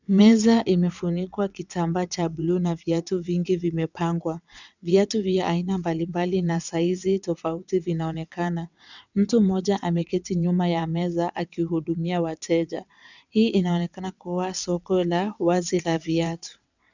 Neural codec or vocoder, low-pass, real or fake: vocoder, 22.05 kHz, 80 mel bands, WaveNeXt; 7.2 kHz; fake